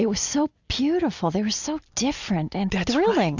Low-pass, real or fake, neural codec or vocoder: 7.2 kHz; real; none